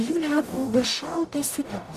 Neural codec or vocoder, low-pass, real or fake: codec, 44.1 kHz, 0.9 kbps, DAC; 14.4 kHz; fake